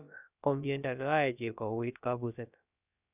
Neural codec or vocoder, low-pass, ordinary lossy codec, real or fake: codec, 16 kHz, about 1 kbps, DyCAST, with the encoder's durations; 3.6 kHz; none; fake